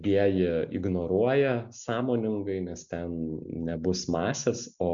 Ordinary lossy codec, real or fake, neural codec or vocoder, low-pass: MP3, 64 kbps; real; none; 7.2 kHz